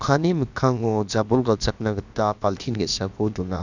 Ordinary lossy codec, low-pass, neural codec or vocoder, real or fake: Opus, 64 kbps; 7.2 kHz; codec, 16 kHz, about 1 kbps, DyCAST, with the encoder's durations; fake